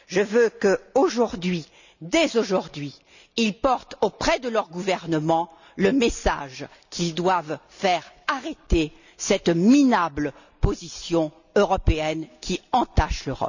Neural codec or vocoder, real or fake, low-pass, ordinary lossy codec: none; real; 7.2 kHz; none